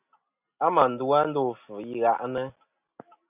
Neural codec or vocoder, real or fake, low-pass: none; real; 3.6 kHz